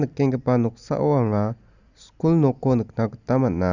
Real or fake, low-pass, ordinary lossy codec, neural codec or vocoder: real; 7.2 kHz; Opus, 64 kbps; none